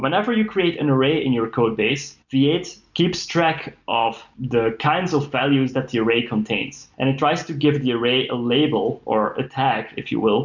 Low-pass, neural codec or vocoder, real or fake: 7.2 kHz; none; real